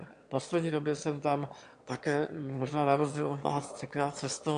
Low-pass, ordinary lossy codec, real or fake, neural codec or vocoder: 9.9 kHz; AAC, 64 kbps; fake; autoencoder, 22.05 kHz, a latent of 192 numbers a frame, VITS, trained on one speaker